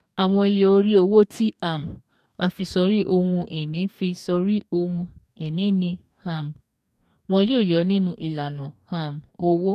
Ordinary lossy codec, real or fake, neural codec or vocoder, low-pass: none; fake; codec, 44.1 kHz, 2.6 kbps, DAC; 14.4 kHz